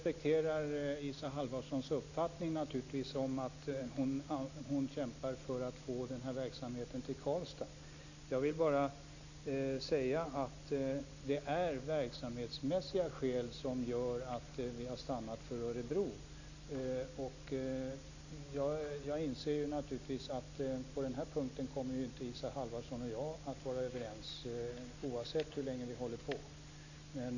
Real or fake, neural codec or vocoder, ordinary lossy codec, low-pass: real; none; none; 7.2 kHz